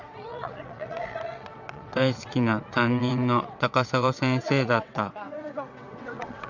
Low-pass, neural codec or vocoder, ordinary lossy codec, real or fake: 7.2 kHz; vocoder, 22.05 kHz, 80 mel bands, WaveNeXt; none; fake